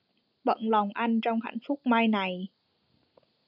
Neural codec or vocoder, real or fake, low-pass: none; real; 5.4 kHz